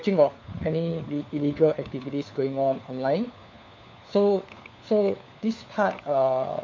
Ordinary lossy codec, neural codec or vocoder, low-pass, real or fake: MP3, 64 kbps; codec, 16 kHz, 4 kbps, FunCodec, trained on LibriTTS, 50 frames a second; 7.2 kHz; fake